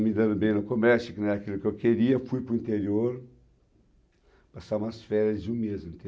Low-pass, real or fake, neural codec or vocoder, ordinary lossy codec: none; real; none; none